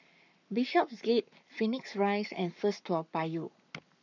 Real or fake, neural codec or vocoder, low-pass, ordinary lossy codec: fake; codec, 44.1 kHz, 7.8 kbps, Pupu-Codec; 7.2 kHz; none